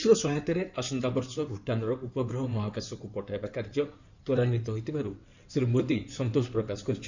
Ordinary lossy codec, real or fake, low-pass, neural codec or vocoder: none; fake; 7.2 kHz; codec, 16 kHz in and 24 kHz out, 2.2 kbps, FireRedTTS-2 codec